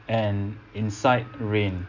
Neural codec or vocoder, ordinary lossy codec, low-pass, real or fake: none; none; 7.2 kHz; real